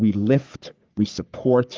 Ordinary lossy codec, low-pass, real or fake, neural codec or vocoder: Opus, 32 kbps; 7.2 kHz; fake; codec, 44.1 kHz, 3.4 kbps, Pupu-Codec